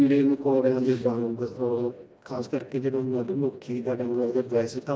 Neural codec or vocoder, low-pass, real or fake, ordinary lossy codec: codec, 16 kHz, 1 kbps, FreqCodec, smaller model; none; fake; none